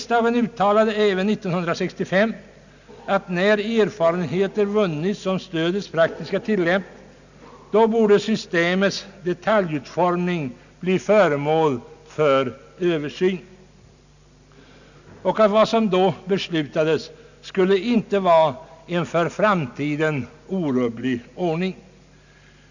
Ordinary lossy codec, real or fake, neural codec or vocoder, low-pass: none; real; none; 7.2 kHz